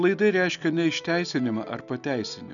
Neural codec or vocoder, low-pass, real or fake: none; 7.2 kHz; real